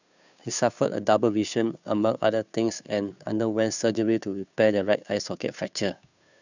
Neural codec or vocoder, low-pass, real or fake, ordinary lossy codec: codec, 16 kHz, 2 kbps, FunCodec, trained on Chinese and English, 25 frames a second; 7.2 kHz; fake; none